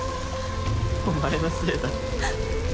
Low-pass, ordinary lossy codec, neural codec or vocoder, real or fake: none; none; none; real